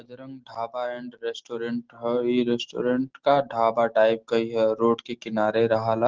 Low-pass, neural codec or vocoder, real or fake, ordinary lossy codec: 7.2 kHz; none; real; Opus, 16 kbps